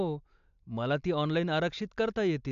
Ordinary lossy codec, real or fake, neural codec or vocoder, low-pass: AAC, 64 kbps; real; none; 7.2 kHz